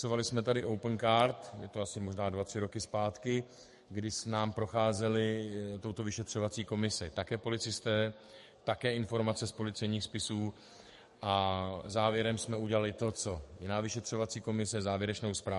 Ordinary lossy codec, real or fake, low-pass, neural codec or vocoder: MP3, 48 kbps; fake; 14.4 kHz; codec, 44.1 kHz, 7.8 kbps, DAC